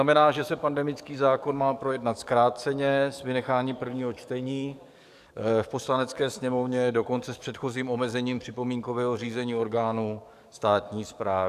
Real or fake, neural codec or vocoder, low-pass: fake; codec, 44.1 kHz, 7.8 kbps, DAC; 14.4 kHz